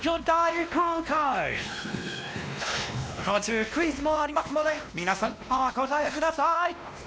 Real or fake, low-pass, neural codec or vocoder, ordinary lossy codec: fake; none; codec, 16 kHz, 1 kbps, X-Codec, WavLM features, trained on Multilingual LibriSpeech; none